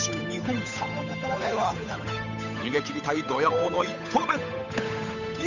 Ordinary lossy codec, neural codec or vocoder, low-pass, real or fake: none; codec, 16 kHz, 8 kbps, FunCodec, trained on Chinese and English, 25 frames a second; 7.2 kHz; fake